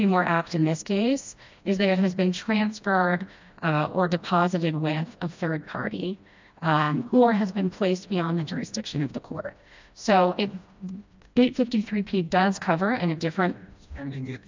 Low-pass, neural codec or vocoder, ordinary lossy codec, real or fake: 7.2 kHz; codec, 16 kHz, 1 kbps, FreqCodec, smaller model; MP3, 64 kbps; fake